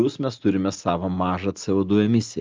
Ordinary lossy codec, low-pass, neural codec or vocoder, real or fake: Opus, 24 kbps; 7.2 kHz; none; real